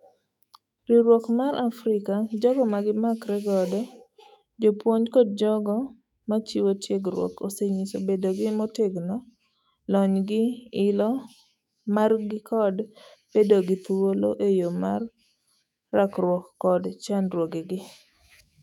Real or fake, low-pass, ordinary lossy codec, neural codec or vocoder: fake; 19.8 kHz; none; autoencoder, 48 kHz, 128 numbers a frame, DAC-VAE, trained on Japanese speech